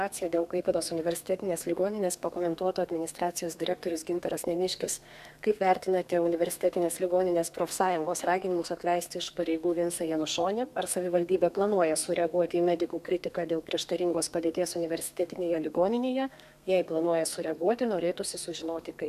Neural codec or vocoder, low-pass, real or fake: codec, 32 kHz, 1.9 kbps, SNAC; 14.4 kHz; fake